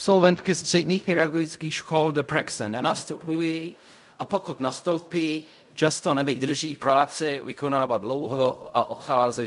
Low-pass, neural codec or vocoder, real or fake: 10.8 kHz; codec, 16 kHz in and 24 kHz out, 0.4 kbps, LongCat-Audio-Codec, fine tuned four codebook decoder; fake